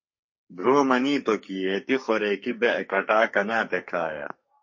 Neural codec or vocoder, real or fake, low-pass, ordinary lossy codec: codec, 44.1 kHz, 2.6 kbps, SNAC; fake; 7.2 kHz; MP3, 32 kbps